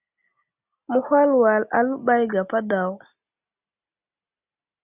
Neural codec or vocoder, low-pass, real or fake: none; 3.6 kHz; real